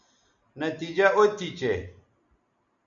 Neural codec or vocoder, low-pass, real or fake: none; 7.2 kHz; real